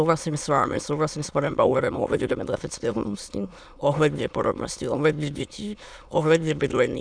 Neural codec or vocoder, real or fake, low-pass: autoencoder, 22.05 kHz, a latent of 192 numbers a frame, VITS, trained on many speakers; fake; 9.9 kHz